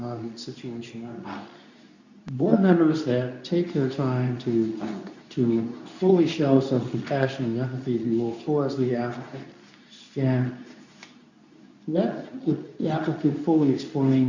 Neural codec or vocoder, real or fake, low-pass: codec, 24 kHz, 0.9 kbps, WavTokenizer, medium speech release version 2; fake; 7.2 kHz